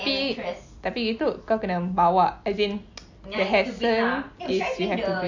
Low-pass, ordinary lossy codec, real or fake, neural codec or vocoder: 7.2 kHz; MP3, 48 kbps; real; none